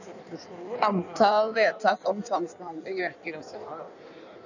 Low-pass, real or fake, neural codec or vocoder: 7.2 kHz; fake; codec, 16 kHz in and 24 kHz out, 1.1 kbps, FireRedTTS-2 codec